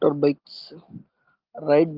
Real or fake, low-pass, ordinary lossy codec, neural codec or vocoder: real; 5.4 kHz; Opus, 16 kbps; none